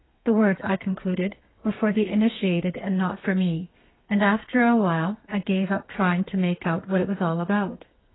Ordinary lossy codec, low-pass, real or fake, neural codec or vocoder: AAC, 16 kbps; 7.2 kHz; fake; codec, 32 kHz, 1.9 kbps, SNAC